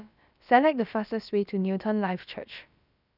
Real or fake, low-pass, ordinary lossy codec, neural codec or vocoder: fake; 5.4 kHz; none; codec, 16 kHz, about 1 kbps, DyCAST, with the encoder's durations